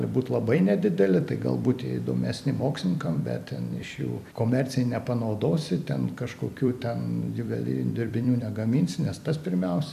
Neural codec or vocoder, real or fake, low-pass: vocoder, 44.1 kHz, 128 mel bands every 256 samples, BigVGAN v2; fake; 14.4 kHz